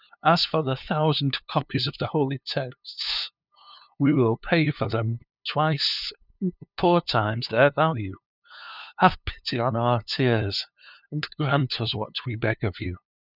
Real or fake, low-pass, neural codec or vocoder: fake; 5.4 kHz; codec, 16 kHz, 2 kbps, FunCodec, trained on LibriTTS, 25 frames a second